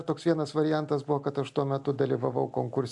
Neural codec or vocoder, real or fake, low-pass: none; real; 10.8 kHz